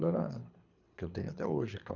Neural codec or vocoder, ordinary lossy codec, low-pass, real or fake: codec, 24 kHz, 3 kbps, HILCodec; none; 7.2 kHz; fake